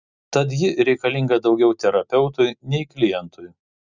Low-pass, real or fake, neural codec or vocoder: 7.2 kHz; real; none